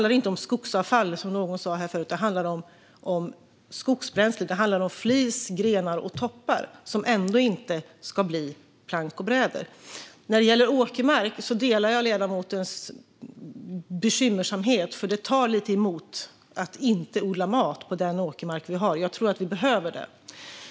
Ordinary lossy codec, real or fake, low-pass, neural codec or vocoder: none; real; none; none